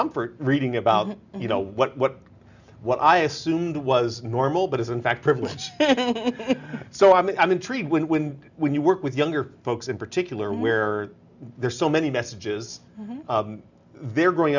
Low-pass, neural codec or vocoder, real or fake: 7.2 kHz; none; real